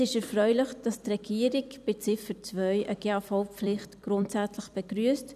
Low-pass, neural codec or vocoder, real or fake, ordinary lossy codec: 14.4 kHz; vocoder, 44.1 kHz, 128 mel bands every 256 samples, BigVGAN v2; fake; none